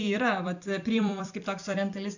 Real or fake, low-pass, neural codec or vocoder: fake; 7.2 kHz; vocoder, 44.1 kHz, 128 mel bands every 256 samples, BigVGAN v2